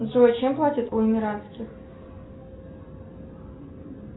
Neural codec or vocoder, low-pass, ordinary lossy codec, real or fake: none; 7.2 kHz; AAC, 16 kbps; real